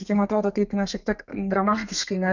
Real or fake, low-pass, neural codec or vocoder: fake; 7.2 kHz; codec, 32 kHz, 1.9 kbps, SNAC